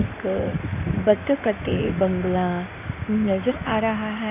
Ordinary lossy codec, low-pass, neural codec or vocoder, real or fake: none; 3.6 kHz; codec, 16 kHz in and 24 kHz out, 2.2 kbps, FireRedTTS-2 codec; fake